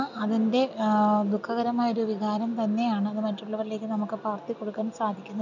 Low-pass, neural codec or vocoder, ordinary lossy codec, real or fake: 7.2 kHz; none; none; real